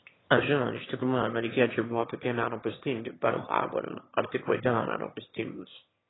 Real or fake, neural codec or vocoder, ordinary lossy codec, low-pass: fake; autoencoder, 22.05 kHz, a latent of 192 numbers a frame, VITS, trained on one speaker; AAC, 16 kbps; 7.2 kHz